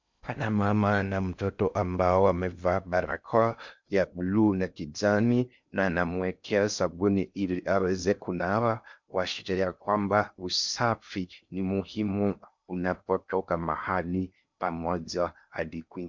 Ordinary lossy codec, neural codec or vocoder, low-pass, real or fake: MP3, 64 kbps; codec, 16 kHz in and 24 kHz out, 0.6 kbps, FocalCodec, streaming, 2048 codes; 7.2 kHz; fake